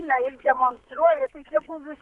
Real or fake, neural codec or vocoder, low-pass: fake; codec, 32 kHz, 1.9 kbps, SNAC; 10.8 kHz